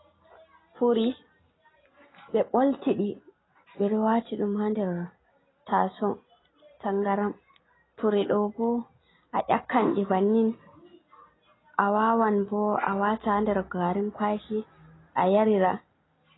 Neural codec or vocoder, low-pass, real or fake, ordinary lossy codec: none; 7.2 kHz; real; AAC, 16 kbps